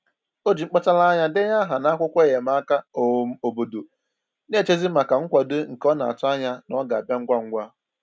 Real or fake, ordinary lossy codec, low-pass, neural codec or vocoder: real; none; none; none